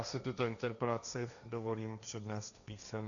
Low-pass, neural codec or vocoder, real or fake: 7.2 kHz; codec, 16 kHz, 1.1 kbps, Voila-Tokenizer; fake